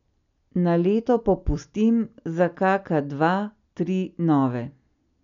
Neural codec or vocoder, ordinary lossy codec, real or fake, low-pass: none; none; real; 7.2 kHz